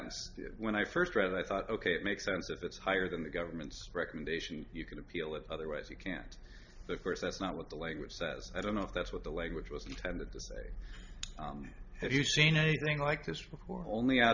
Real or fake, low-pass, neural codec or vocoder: real; 7.2 kHz; none